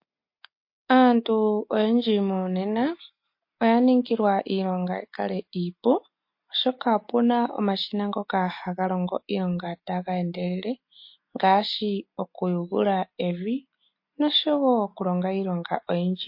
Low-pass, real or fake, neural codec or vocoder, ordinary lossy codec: 5.4 kHz; real; none; MP3, 32 kbps